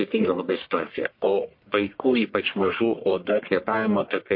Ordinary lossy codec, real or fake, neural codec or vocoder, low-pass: MP3, 32 kbps; fake; codec, 44.1 kHz, 1.7 kbps, Pupu-Codec; 5.4 kHz